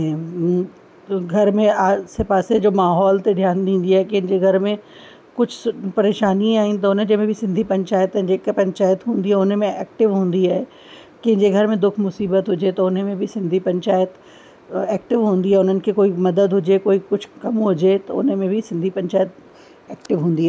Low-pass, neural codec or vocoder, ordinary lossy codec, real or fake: none; none; none; real